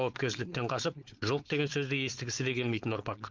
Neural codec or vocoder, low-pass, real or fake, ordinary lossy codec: codec, 16 kHz, 4.8 kbps, FACodec; 7.2 kHz; fake; Opus, 32 kbps